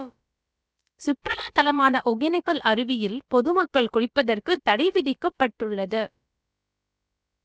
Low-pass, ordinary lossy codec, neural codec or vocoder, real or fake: none; none; codec, 16 kHz, about 1 kbps, DyCAST, with the encoder's durations; fake